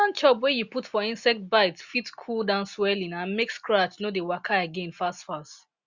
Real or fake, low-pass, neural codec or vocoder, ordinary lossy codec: real; 7.2 kHz; none; Opus, 64 kbps